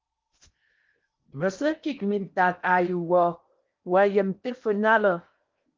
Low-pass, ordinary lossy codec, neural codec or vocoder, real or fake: 7.2 kHz; Opus, 24 kbps; codec, 16 kHz in and 24 kHz out, 0.8 kbps, FocalCodec, streaming, 65536 codes; fake